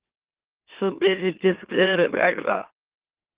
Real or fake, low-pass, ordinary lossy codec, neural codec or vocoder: fake; 3.6 kHz; Opus, 16 kbps; autoencoder, 44.1 kHz, a latent of 192 numbers a frame, MeloTTS